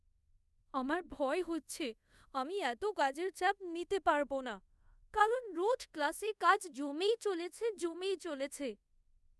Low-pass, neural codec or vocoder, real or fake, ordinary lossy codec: none; codec, 24 kHz, 0.5 kbps, DualCodec; fake; none